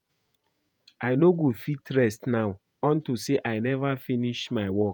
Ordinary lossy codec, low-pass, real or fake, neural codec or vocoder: none; none; fake; vocoder, 48 kHz, 128 mel bands, Vocos